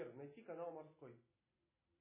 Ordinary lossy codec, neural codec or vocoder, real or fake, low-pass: MP3, 16 kbps; none; real; 3.6 kHz